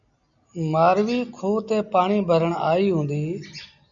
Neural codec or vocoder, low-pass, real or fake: none; 7.2 kHz; real